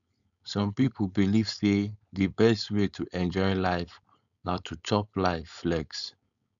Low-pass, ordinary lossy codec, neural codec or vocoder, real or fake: 7.2 kHz; none; codec, 16 kHz, 4.8 kbps, FACodec; fake